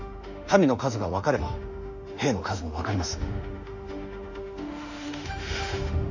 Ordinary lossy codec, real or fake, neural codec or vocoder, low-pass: none; fake; autoencoder, 48 kHz, 32 numbers a frame, DAC-VAE, trained on Japanese speech; 7.2 kHz